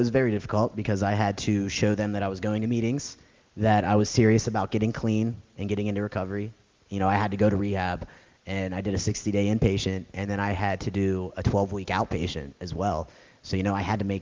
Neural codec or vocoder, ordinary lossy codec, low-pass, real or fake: none; Opus, 32 kbps; 7.2 kHz; real